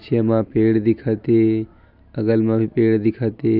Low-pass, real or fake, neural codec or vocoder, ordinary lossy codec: 5.4 kHz; real; none; none